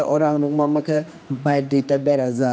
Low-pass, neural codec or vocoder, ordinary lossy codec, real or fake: none; codec, 16 kHz, 2 kbps, X-Codec, HuBERT features, trained on general audio; none; fake